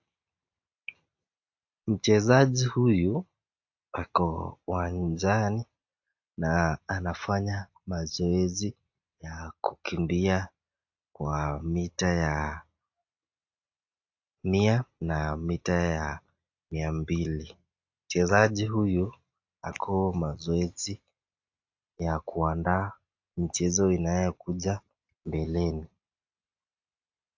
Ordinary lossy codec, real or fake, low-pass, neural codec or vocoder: AAC, 48 kbps; real; 7.2 kHz; none